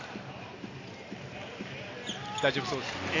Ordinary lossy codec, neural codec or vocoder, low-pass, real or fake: AAC, 32 kbps; none; 7.2 kHz; real